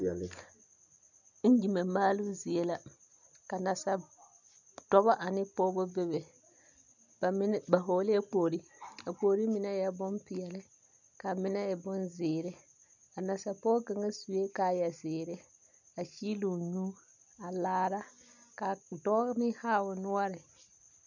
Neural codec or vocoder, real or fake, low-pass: none; real; 7.2 kHz